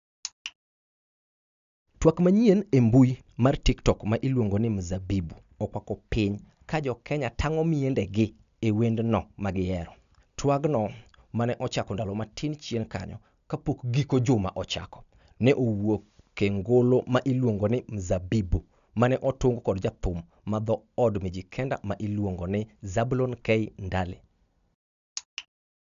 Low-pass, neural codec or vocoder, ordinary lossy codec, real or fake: 7.2 kHz; none; none; real